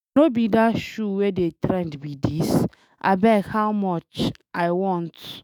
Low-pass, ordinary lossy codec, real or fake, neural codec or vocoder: none; none; fake; autoencoder, 48 kHz, 128 numbers a frame, DAC-VAE, trained on Japanese speech